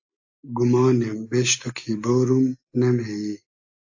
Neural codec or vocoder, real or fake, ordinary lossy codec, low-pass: none; real; AAC, 48 kbps; 7.2 kHz